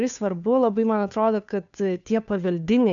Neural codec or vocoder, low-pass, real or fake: codec, 16 kHz, 2 kbps, FunCodec, trained on Chinese and English, 25 frames a second; 7.2 kHz; fake